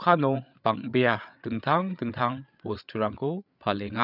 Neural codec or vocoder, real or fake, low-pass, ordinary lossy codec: codec, 16 kHz, 8 kbps, FreqCodec, larger model; fake; 5.4 kHz; none